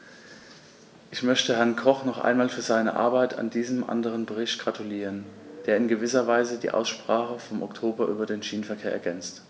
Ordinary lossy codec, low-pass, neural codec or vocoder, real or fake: none; none; none; real